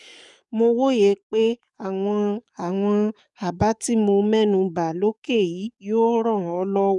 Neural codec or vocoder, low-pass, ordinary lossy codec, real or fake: codec, 44.1 kHz, 7.8 kbps, DAC; 10.8 kHz; none; fake